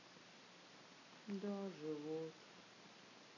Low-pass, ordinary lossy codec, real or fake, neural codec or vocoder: 7.2 kHz; none; real; none